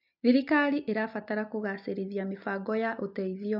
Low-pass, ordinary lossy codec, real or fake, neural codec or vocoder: 5.4 kHz; MP3, 48 kbps; real; none